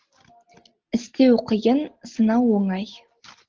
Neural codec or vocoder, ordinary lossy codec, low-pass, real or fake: none; Opus, 16 kbps; 7.2 kHz; real